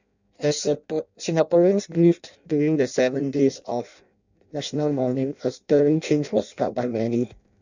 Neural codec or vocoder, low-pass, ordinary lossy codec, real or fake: codec, 16 kHz in and 24 kHz out, 0.6 kbps, FireRedTTS-2 codec; 7.2 kHz; none; fake